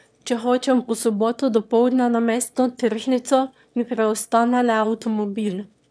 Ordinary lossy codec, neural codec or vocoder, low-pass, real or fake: none; autoencoder, 22.05 kHz, a latent of 192 numbers a frame, VITS, trained on one speaker; none; fake